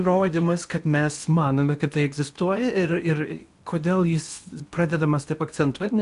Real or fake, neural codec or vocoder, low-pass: fake; codec, 16 kHz in and 24 kHz out, 0.8 kbps, FocalCodec, streaming, 65536 codes; 10.8 kHz